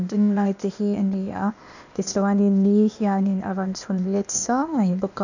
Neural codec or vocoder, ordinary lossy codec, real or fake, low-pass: codec, 16 kHz, 0.8 kbps, ZipCodec; none; fake; 7.2 kHz